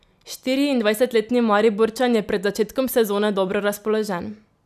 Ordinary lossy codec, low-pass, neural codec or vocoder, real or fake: none; 14.4 kHz; none; real